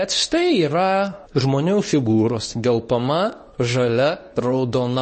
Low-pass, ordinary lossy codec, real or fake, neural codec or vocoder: 10.8 kHz; MP3, 32 kbps; fake; codec, 24 kHz, 0.9 kbps, WavTokenizer, medium speech release version 2